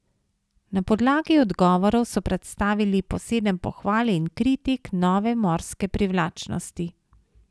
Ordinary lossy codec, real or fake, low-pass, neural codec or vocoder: none; real; none; none